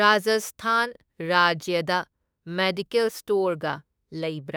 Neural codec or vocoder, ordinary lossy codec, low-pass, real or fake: autoencoder, 48 kHz, 32 numbers a frame, DAC-VAE, trained on Japanese speech; none; none; fake